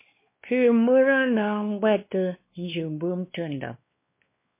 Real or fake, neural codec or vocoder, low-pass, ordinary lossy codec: fake; codec, 16 kHz, 2 kbps, X-Codec, WavLM features, trained on Multilingual LibriSpeech; 3.6 kHz; MP3, 24 kbps